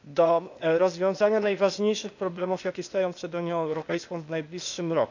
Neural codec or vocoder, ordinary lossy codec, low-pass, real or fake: codec, 16 kHz, 0.8 kbps, ZipCodec; none; 7.2 kHz; fake